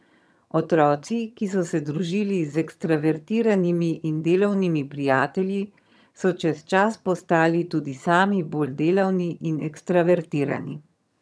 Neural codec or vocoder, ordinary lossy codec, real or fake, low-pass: vocoder, 22.05 kHz, 80 mel bands, HiFi-GAN; none; fake; none